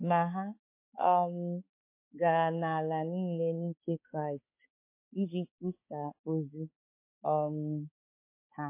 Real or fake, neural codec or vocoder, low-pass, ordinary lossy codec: fake; codec, 16 kHz, 4 kbps, X-Codec, HuBERT features, trained on balanced general audio; 3.6 kHz; MP3, 24 kbps